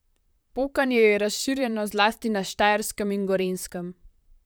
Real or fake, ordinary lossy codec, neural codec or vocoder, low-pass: fake; none; vocoder, 44.1 kHz, 128 mel bands, Pupu-Vocoder; none